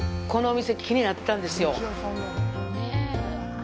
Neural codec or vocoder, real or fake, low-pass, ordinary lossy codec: none; real; none; none